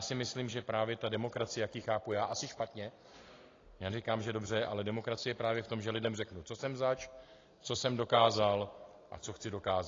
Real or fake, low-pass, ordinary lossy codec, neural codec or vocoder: real; 7.2 kHz; AAC, 32 kbps; none